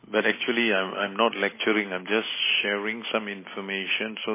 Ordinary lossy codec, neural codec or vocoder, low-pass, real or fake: MP3, 16 kbps; none; 3.6 kHz; real